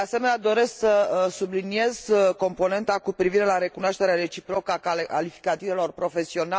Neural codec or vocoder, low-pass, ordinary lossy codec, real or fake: none; none; none; real